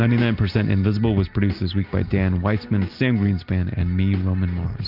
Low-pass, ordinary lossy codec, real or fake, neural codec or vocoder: 5.4 kHz; Opus, 24 kbps; real; none